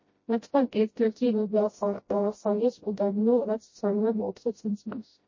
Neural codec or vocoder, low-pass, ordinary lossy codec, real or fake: codec, 16 kHz, 0.5 kbps, FreqCodec, smaller model; 7.2 kHz; MP3, 32 kbps; fake